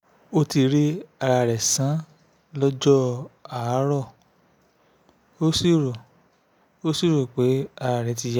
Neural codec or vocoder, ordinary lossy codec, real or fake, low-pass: none; none; real; none